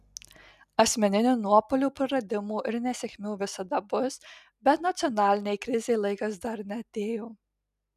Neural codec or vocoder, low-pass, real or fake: none; 14.4 kHz; real